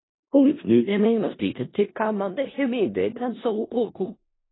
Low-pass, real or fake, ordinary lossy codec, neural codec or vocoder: 7.2 kHz; fake; AAC, 16 kbps; codec, 16 kHz in and 24 kHz out, 0.4 kbps, LongCat-Audio-Codec, four codebook decoder